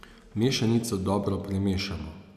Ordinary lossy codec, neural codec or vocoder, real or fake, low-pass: none; none; real; 14.4 kHz